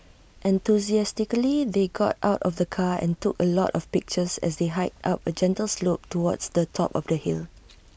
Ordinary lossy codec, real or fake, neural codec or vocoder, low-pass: none; real; none; none